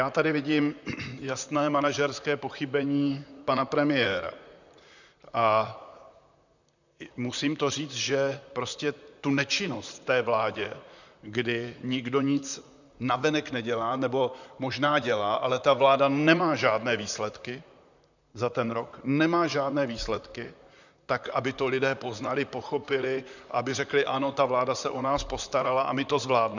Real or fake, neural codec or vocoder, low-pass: fake; vocoder, 44.1 kHz, 128 mel bands, Pupu-Vocoder; 7.2 kHz